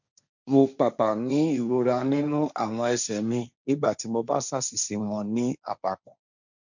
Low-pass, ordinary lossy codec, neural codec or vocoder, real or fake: 7.2 kHz; none; codec, 16 kHz, 1.1 kbps, Voila-Tokenizer; fake